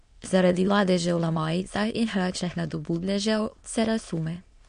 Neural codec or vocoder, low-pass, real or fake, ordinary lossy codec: autoencoder, 22.05 kHz, a latent of 192 numbers a frame, VITS, trained on many speakers; 9.9 kHz; fake; MP3, 48 kbps